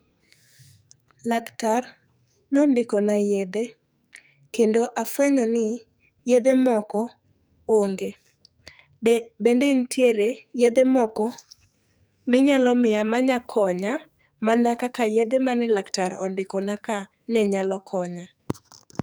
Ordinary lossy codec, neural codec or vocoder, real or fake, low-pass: none; codec, 44.1 kHz, 2.6 kbps, SNAC; fake; none